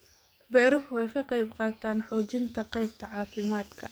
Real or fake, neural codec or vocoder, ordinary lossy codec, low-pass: fake; codec, 44.1 kHz, 2.6 kbps, SNAC; none; none